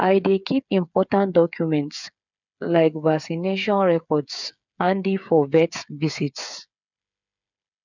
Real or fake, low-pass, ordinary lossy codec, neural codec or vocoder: fake; 7.2 kHz; none; codec, 16 kHz, 8 kbps, FreqCodec, smaller model